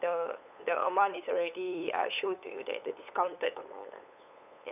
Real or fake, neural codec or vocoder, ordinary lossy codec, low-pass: fake; codec, 16 kHz, 8 kbps, FunCodec, trained on LibriTTS, 25 frames a second; none; 3.6 kHz